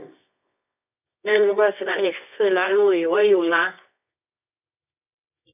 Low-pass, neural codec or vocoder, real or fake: 3.6 kHz; codec, 24 kHz, 0.9 kbps, WavTokenizer, medium music audio release; fake